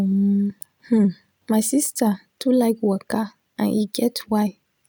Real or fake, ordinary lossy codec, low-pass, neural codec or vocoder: real; none; none; none